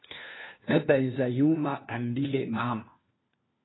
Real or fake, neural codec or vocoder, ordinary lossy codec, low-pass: fake; codec, 16 kHz, 1 kbps, FunCodec, trained on LibriTTS, 50 frames a second; AAC, 16 kbps; 7.2 kHz